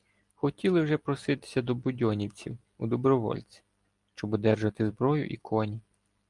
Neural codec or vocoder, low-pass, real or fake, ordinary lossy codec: none; 10.8 kHz; real; Opus, 32 kbps